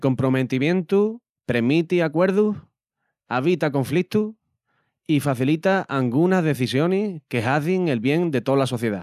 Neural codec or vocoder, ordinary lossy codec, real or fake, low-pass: none; none; real; 14.4 kHz